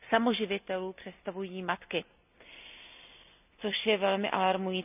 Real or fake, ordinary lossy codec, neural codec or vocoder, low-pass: real; none; none; 3.6 kHz